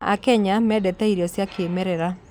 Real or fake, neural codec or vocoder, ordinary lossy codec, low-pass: real; none; none; 19.8 kHz